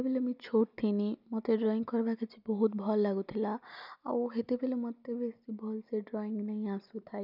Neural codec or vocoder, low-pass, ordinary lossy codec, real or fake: none; 5.4 kHz; none; real